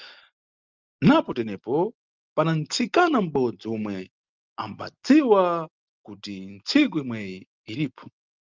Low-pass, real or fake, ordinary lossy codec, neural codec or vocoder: 7.2 kHz; real; Opus, 24 kbps; none